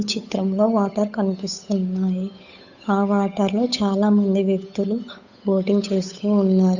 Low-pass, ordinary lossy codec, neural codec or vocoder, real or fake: 7.2 kHz; none; codec, 16 kHz, 8 kbps, FunCodec, trained on Chinese and English, 25 frames a second; fake